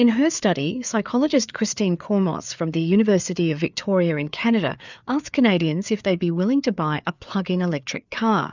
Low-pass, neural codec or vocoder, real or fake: 7.2 kHz; codec, 16 kHz, 4 kbps, FreqCodec, larger model; fake